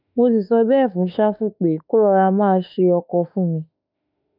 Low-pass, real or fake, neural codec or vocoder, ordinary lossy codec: 5.4 kHz; fake; autoencoder, 48 kHz, 32 numbers a frame, DAC-VAE, trained on Japanese speech; none